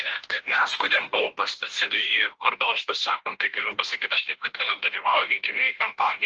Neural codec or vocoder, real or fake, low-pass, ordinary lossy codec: codec, 16 kHz, 0.5 kbps, FunCodec, trained on Chinese and English, 25 frames a second; fake; 7.2 kHz; Opus, 16 kbps